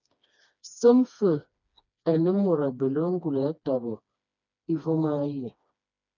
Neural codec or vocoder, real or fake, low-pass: codec, 16 kHz, 2 kbps, FreqCodec, smaller model; fake; 7.2 kHz